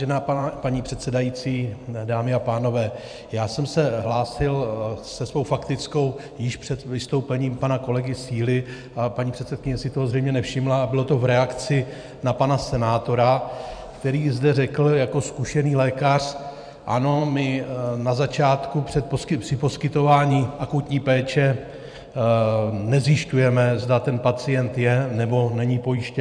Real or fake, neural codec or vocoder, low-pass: fake; vocoder, 44.1 kHz, 128 mel bands every 512 samples, BigVGAN v2; 9.9 kHz